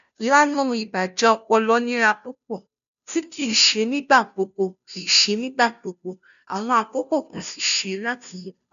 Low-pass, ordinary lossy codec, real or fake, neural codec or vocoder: 7.2 kHz; none; fake; codec, 16 kHz, 0.5 kbps, FunCodec, trained on Chinese and English, 25 frames a second